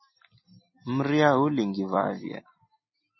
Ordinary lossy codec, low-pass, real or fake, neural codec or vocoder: MP3, 24 kbps; 7.2 kHz; real; none